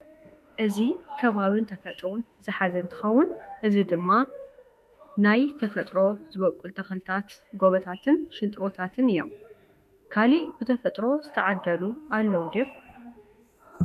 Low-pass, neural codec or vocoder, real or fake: 14.4 kHz; autoencoder, 48 kHz, 32 numbers a frame, DAC-VAE, trained on Japanese speech; fake